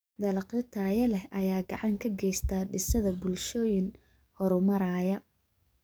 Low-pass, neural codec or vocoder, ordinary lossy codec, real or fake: none; codec, 44.1 kHz, 7.8 kbps, DAC; none; fake